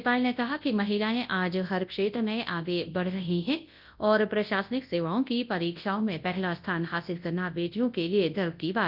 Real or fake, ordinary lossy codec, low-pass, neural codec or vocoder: fake; Opus, 24 kbps; 5.4 kHz; codec, 24 kHz, 0.9 kbps, WavTokenizer, large speech release